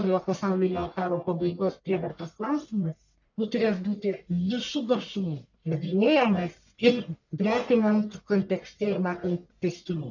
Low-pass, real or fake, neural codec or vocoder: 7.2 kHz; fake; codec, 44.1 kHz, 1.7 kbps, Pupu-Codec